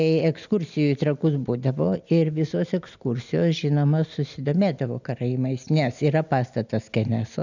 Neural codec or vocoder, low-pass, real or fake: none; 7.2 kHz; real